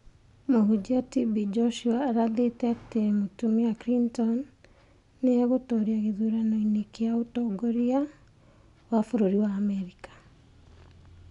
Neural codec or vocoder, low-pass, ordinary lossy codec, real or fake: none; 10.8 kHz; none; real